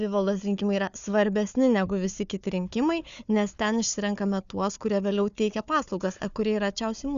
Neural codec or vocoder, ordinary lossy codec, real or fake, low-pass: codec, 16 kHz, 4 kbps, FunCodec, trained on Chinese and English, 50 frames a second; Opus, 64 kbps; fake; 7.2 kHz